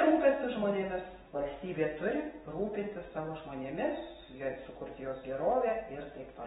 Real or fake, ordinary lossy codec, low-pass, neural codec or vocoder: real; AAC, 16 kbps; 10.8 kHz; none